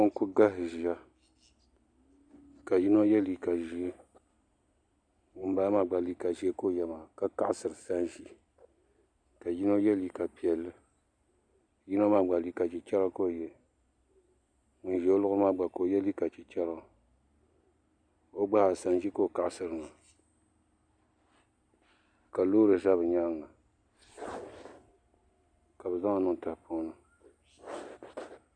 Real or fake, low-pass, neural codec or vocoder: real; 9.9 kHz; none